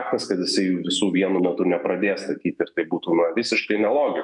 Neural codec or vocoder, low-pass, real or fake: autoencoder, 48 kHz, 128 numbers a frame, DAC-VAE, trained on Japanese speech; 10.8 kHz; fake